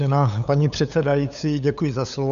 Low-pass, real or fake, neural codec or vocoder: 7.2 kHz; fake; codec, 16 kHz, 8 kbps, FunCodec, trained on LibriTTS, 25 frames a second